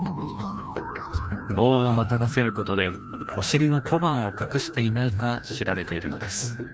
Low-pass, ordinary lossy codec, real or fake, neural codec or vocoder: none; none; fake; codec, 16 kHz, 1 kbps, FreqCodec, larger model